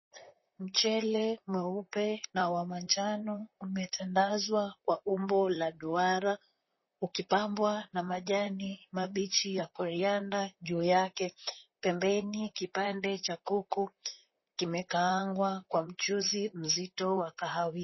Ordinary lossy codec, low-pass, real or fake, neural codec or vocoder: MP3, 24 kbps; 7.2 kHz; fake; vocoder, 44.1 kHz, 128 mel bands, Pupu-Vocoder